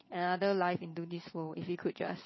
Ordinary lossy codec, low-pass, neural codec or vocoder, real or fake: MP3, 24 kbps; 7.2 kHz; vocoder, 22.05 kHz, 80 mel bands, Vocos; fake